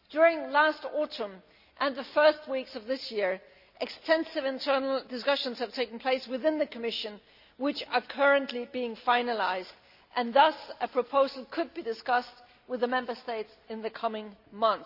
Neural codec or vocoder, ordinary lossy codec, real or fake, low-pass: none; none; real; 5.4 kHz